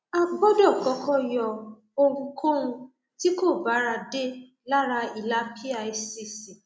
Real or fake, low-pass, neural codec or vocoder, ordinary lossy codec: real; none; none; none